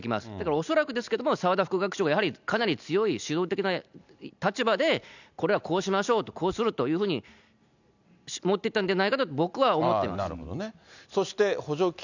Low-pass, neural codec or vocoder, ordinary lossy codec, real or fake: 7.2 kHz; none; none; real